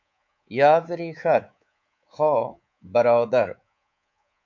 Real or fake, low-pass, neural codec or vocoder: fake; 7.2 kHz; codec, 24 kHz, 3.1 kbps, DualCodec